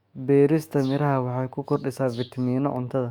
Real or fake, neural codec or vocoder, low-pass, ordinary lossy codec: fake; autoencoder, 48 kHz, 128 numbers a frame, DAC-VAE, trained on Japanese speech; 19.8 kHz; Opus, 64 kbps